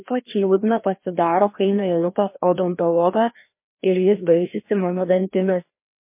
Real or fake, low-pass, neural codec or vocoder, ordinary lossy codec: fake; 3.6 kHz; codec, 16 kHz, 1 kbps, FreqCodec, larger model; MP3, 24 kbps